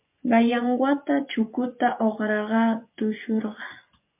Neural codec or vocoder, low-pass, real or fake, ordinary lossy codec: vocoder, 24 kHz, 100 mel bands, Vocos; 3.6 kHz; fake; MP3, 32 kbps